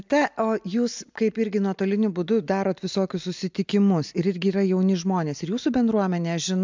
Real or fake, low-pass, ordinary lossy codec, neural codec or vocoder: real; 7.2 kHz; MP3, 64 kbps; none